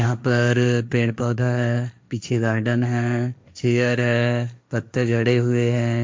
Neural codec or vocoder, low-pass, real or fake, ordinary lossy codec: codec, 16 kHz, 1.1 kbps, Voila-Tokenizer; none; fake; none